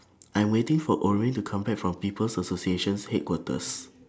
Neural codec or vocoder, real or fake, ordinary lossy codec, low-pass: none; real; none; none